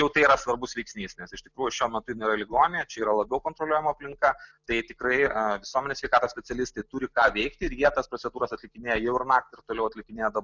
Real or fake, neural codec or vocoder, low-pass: real; none; 7.2 kHz